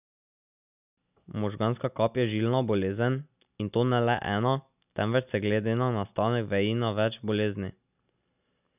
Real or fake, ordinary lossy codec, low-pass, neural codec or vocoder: real; none; 3.6 kHz; none